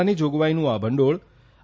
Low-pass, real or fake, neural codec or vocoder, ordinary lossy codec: none; real; none; none